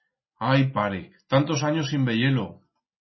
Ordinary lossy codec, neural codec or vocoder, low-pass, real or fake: MP3, 24 kbps; none; 7.2 kHz; real